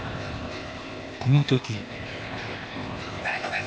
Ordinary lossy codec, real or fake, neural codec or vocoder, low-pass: none; fake; codec, 16 kHz, 0.8 kbps, ZipCodec; none